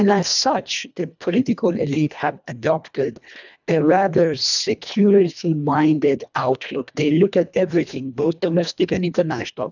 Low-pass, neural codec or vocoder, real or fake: 7.2 kHz; codec, 24 kHz, 1.5 kbps, HILCodec; fake